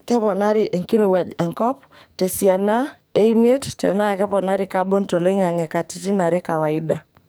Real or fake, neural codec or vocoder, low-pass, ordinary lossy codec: fake; codec, 44.1 kHz, 2.6 kbps, SNAC; none; none